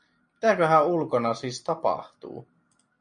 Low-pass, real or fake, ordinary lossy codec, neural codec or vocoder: 9.9 kHz; real; MP3, 48 kbps; none